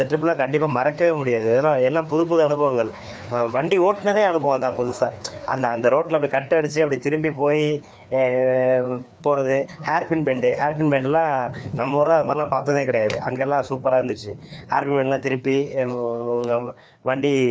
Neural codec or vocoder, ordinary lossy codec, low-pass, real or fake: codec, 16 kHz, 2 kbps, FreqCodec, larger model; none; none; fake